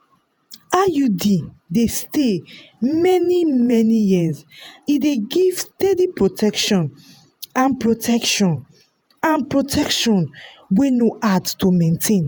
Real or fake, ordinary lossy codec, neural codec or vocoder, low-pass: fake; none; vocoder, 48 kHz, 128 mel bands, Vocos; none